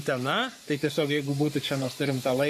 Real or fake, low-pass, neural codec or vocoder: fake; 14.4 kHz; codec, 44.1 kHz, 3.4 kbps, Pupu-Codec